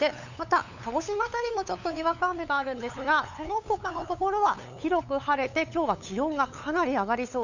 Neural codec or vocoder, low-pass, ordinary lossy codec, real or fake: codec, 16 kHz, 8 kbps, FunCodec, trained on LibriTTS, 25 frames a second; 7.2 kHz; none; fake